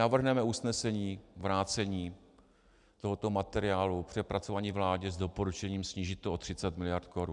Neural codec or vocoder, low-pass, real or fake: none; 10.8 kHz; real